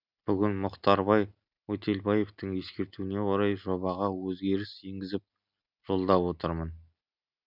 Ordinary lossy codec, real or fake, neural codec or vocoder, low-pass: none; real; none; 5.4 kHz